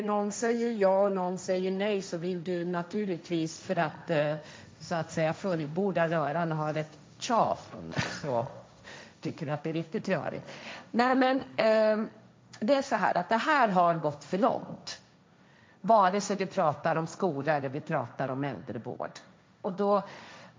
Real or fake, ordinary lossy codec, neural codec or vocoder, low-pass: fake; none; codec, 16 kHz, 1.1 kbps, Voila-Tokenizer; none